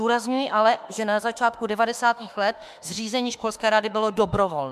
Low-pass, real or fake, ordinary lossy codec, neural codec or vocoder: 14.4 kHz; fake; MP3, 96 kbps; autoencoder, 48 kHz, 32 numbers a frame, DAC-VAE, trained on Japanese speech